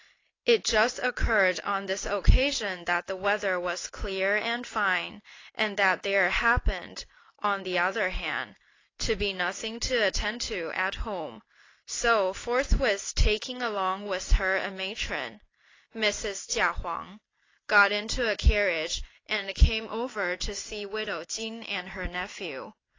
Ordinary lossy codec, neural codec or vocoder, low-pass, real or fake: AAC, 32 kbps; none; 7.2 kHz; real